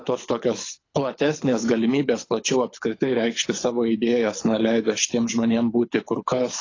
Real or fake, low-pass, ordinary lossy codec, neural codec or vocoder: fake; 7.2 kHz; AAC, 32 kbps; codec, 24 kHz, 6 kbps, HILCodec